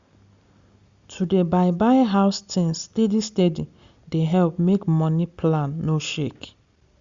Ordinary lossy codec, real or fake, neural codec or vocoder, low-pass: Opus, 64 kbps; real; none; 7.2 kHz